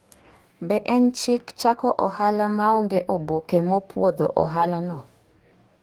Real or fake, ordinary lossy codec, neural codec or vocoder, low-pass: fake; Opus, 32 kbps; codec, 44.1 kHz, 2.6 kbps, DAC; 19.8 kHz